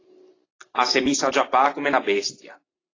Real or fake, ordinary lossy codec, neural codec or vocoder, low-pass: real; AAC, 32 kbps; none; 7.2 kHz